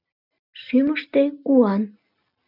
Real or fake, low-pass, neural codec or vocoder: real; 5.4 kHz; none